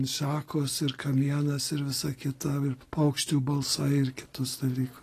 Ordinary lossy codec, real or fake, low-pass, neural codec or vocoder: AAC, 48 kbps; real; 14.4 kHz; none